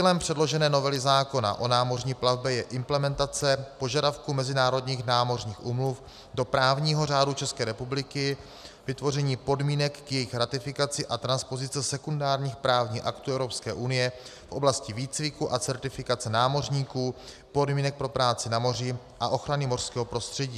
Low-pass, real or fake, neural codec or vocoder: 14.4 kHz; real; none